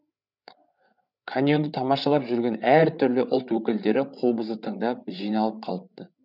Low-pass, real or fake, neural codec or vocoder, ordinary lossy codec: 5.4 kHz; fake; codec, 16 kHz, 8 kbps, FreqCodec, larger model; none